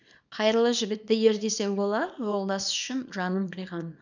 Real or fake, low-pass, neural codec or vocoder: fake; 7.2 kHz; codec, 24 kHz, 0.9 kbps, WavTokenizer, small release